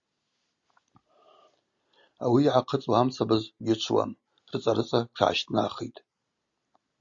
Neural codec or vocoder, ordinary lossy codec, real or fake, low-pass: none; Opus, 64 kbps; real; 7.2 kHz